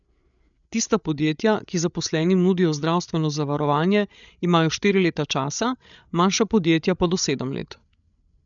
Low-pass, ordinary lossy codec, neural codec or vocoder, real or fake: 7.2 kHz; none; codec, 16 kHz, 8 kbps, FreqCodec, larger model; fake